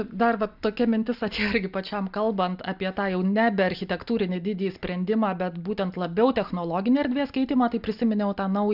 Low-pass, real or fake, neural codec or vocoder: 5.4 kHz; real; none